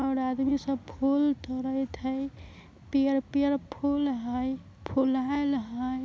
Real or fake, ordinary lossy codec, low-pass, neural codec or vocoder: real; none; none; none